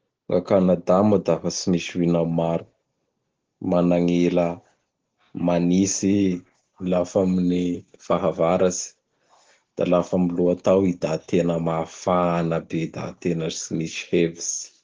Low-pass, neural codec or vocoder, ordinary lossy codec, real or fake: 7.2 kHz; none; Opus, 16 kbps; real